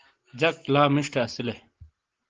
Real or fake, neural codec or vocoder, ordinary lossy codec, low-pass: real; none; Opus, 16 kbps; 7.2 kHz